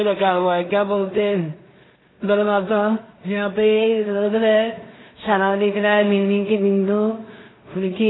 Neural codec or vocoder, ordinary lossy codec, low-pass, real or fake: codec, 16 kHz in and 24 kHz out, 0.4 kbps, LongCat-Audio-Codec, two codebook decoder; AAC, 16 kbps; 7.2 kHz; fake